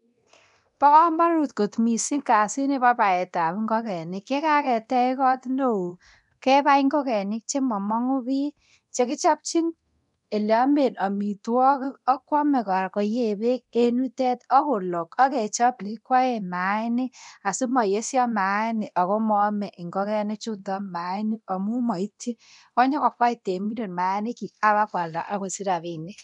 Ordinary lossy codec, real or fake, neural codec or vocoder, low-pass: none; fake; codec, 24 kHz, 0.9 kbps, DualCodec; 10.8 kHz